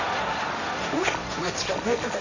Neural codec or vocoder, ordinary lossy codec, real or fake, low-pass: codec, 16 kHz, 1.1 kbps, Voila-Tokenizer; none; fake; 7.2 kHz